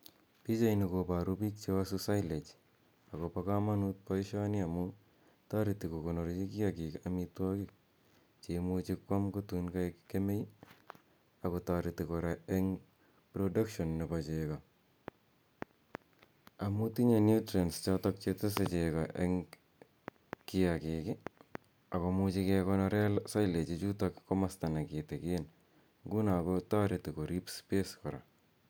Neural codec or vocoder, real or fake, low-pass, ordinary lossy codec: none; real; none; none